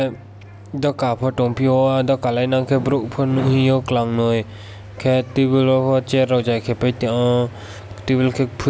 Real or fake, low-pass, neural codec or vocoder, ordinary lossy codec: real; none; none; none